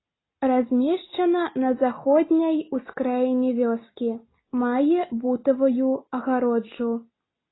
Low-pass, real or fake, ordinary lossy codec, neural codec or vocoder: 7.2 kHz; real; AAC, 16 kbps; none